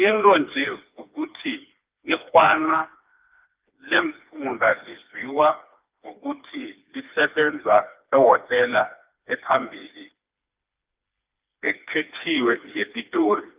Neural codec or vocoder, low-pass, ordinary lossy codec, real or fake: codec, 16 kHz, 2 kbps, FreqCodec, smaller model; 3.6 kHz; Opus, 32 kbps; fake